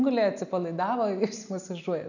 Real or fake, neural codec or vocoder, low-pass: real; none; 7.2 kHz